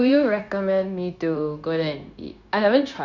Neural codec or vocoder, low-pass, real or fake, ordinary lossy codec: codec, 16 kHz, 0.9 kbps, LongCat-Audio-Codec; 7.2 kHz; fake; Opus, 64 kbps